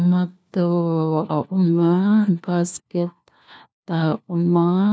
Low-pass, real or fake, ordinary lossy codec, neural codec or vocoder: none; fake; none; codec, 16 kHz, 1 kbps, FunCodec, trained on LibriTTS, 50 frames a second